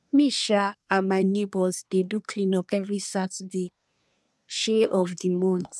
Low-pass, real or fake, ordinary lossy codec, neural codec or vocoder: none; fake; none; codec, 24 kHz, 1 kbps, SNAC